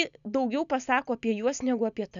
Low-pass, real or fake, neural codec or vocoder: 7.2 kHz; real; none